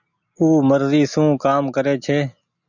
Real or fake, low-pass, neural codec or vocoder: real; 7.2 kHz; none